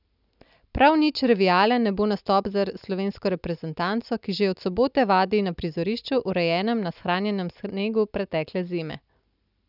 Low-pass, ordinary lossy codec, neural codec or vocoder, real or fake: 5.4 kHz; none; none; real